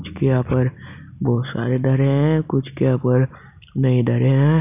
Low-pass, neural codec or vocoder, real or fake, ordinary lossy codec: 3.6 kHz; none; real; none